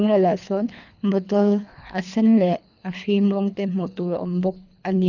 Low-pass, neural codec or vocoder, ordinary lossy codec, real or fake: 7.2 kHz; codec, 24 kHz, 3 kbps, HILCodec; none; fake